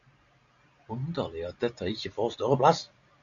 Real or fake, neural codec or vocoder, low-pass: real; none; 7.2 kHz